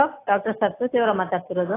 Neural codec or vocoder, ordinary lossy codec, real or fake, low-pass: none; AAC, 16 kbps; real; 3.6 kHz